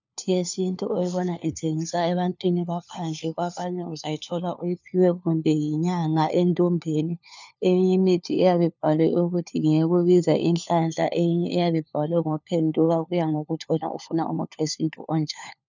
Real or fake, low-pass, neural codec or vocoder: fake; 7.2 kHz; codec, 16 kHz, 4 kbps, FunCodec, trained on LibriTTS, 50 frames a second